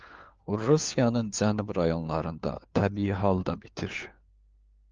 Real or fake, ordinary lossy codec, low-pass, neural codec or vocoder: fake; Opus, 24 kbps; 7.2 kHz; codec, 16 kHz, 4 kbps, FunCodec, trained on LibriTTS, 50 frames a second